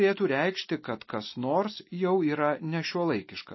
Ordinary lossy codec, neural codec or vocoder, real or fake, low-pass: MP3, 24 kbps; none; real; 7.2 kHz